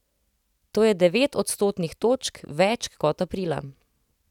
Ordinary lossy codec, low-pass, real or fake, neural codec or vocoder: none; 19.8 kHz; real; none